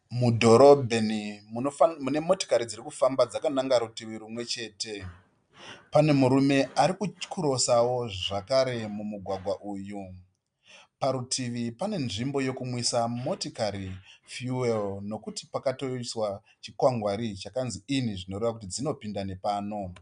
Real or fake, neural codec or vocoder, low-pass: real; none; 9.9 kHz